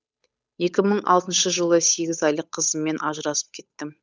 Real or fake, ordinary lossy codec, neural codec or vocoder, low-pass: fake; none; codec, 16 kHz, 8 kbps, FunCodec, trained on Chinese and English, 25 frames a second; none